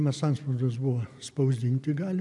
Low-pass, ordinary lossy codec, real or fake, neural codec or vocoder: 10.8 kHz; MP3, 96 kbps; real; none